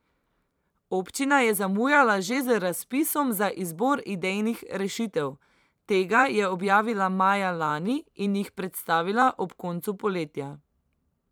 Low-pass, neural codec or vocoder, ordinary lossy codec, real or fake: none; vocoder, 44.1 kHz, 128 mel bands every 512 samples, BigVGAN v2; none; fake